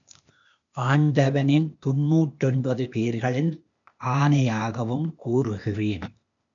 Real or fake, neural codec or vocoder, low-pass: fake; codec, 16 kHz, 0.8 kbps, ZipCodec; 7.2 kHz